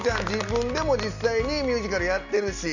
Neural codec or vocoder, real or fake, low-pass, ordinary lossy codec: none; real; 7.2 kHz; none